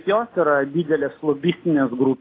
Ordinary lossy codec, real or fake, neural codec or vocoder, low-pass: AAC, 24 kbps; real; none; 5.4 kHz